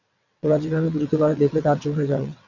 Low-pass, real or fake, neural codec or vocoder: 7.2 kHz; fake; vocoder, 22.05 kHz, 80 mel bands, WaveNeXt